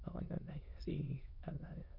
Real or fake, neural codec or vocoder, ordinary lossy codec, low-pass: fake; autoencoder, 22.05 kHz, a latent of 192 numbers a frame, VITS, trained on many speakers; none; 5.4 kHz